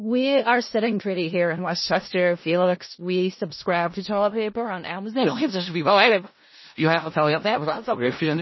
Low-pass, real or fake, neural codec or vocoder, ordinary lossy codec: 7.2 kHz; fake; codec, 16 kHz in and 24 kHz out, 0.4 kbps, LongCat-Audio-Codec, four codebook decoder; MP3, 24 kbps